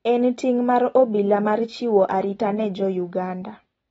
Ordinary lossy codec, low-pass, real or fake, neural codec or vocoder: AAC, 24 kbps; 19.8 kHz; real; none